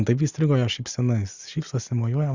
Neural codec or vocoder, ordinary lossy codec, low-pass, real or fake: none; Opus, 64 kbps; 7.2 kHz; real